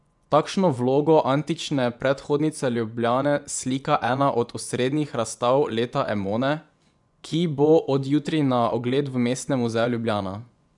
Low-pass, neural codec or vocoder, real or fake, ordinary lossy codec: 10.8 kHz; vocoder, 24 kHz, 100 mel bands, Vocos; fake; none